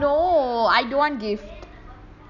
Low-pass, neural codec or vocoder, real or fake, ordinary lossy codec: 7.2 kHz; none; real; none